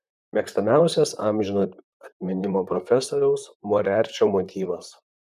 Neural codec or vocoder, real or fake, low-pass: vocoder, 44.1 kHz, 128 mel bands, Pupu-Vocoder; fake; 14.4 kHz